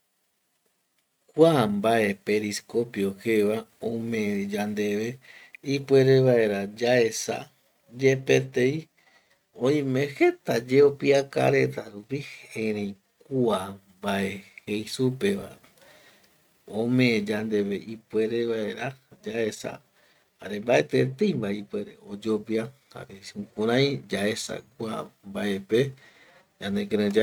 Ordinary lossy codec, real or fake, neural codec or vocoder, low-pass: none; real; none; 19.8 kHz